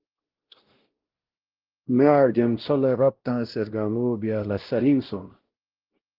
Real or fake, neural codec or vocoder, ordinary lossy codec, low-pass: fake; codec, 16 kHz, 0.5 kbps, X-Codec, WavLM features, trained on Multilingual LibriSpeech; Opus, 16 kbps; 5.4 kHz